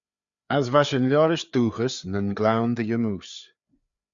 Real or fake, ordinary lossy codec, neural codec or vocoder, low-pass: fake; Opus, 64 kbps; codec, 16 kHz, 4 kbps, FreqCodec, larger model; 7.2 kHz